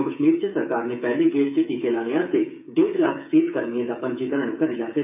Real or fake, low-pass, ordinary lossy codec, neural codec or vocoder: fake; 3.6 kHz; none; codec, 16 kHz, 8 kbps, FreqCodec, smaller model